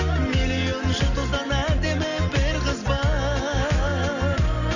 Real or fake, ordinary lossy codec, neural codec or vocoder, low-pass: real; none; none; 7.2 kHz